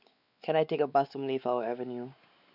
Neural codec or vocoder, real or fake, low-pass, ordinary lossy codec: codec, 16 kHz, 4 kbps, X-Codec, WavLM features, trained on Multilingual LibriSpeech; fake; 5.4 kHz; none